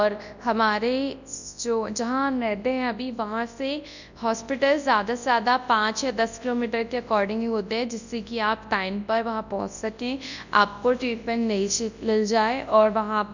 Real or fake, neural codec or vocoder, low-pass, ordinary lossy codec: fake; codec, 24 kHz, 0.9 kbps, WavTokenizer, large speech release; 7.2 kHz; none